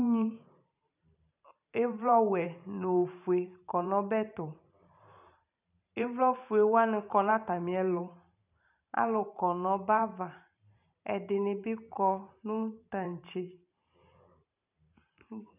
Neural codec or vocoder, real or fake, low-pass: vocoder, 44.1 kHz, 128 mel bands every 512 samples, BigVGAN v2; fake; 3.6 kHz